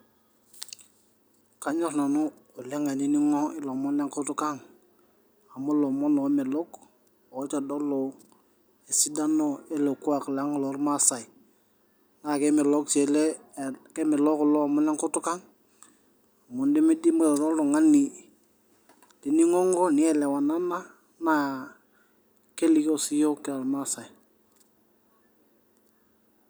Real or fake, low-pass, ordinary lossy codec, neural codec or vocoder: real; none; none; none